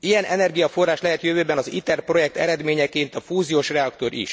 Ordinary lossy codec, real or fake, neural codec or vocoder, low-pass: none; real; none; none